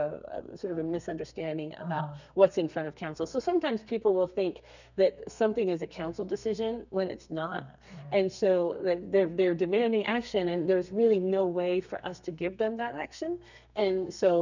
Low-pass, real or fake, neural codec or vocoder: 7.2 kHz; fake; codec, 32 kHz, 1.9 kbps, SNAC